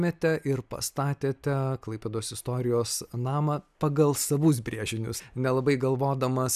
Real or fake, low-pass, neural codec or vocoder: real; 14.4 kHz; none